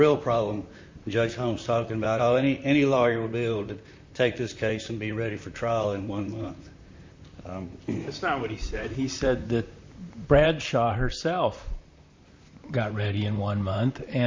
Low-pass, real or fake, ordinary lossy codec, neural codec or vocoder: 7.2 kHz; fake; MP3, 48 kbps; vocoder, 44.1 kHz, 128 mel bands, Pupu-Vocoder